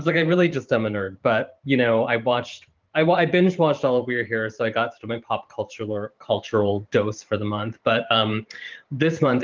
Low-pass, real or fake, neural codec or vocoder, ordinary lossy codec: 7.2 kHz; fake; vocoder, 44.1 kHz, 128 mel bands every 512 samples, BigVGAN v2; Opus, 32 kbps